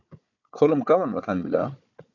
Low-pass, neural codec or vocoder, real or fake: 7.2 kHz; codec, 16 kHz, 4 kbps, FunCodec, trained on Chinese and English, 50 frames a second; fake